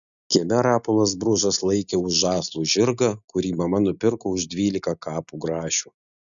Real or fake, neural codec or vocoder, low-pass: real; none; 7.2 kHz